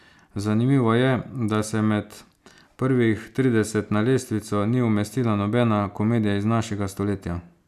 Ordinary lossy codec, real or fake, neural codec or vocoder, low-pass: none; real; none; 14.4 kHz